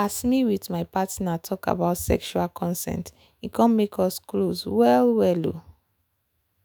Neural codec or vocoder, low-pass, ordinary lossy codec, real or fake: autoencoder, 48 kHz, 128 numbers a frame, DAC-VAE, trained on Japanese speech; none; none; fake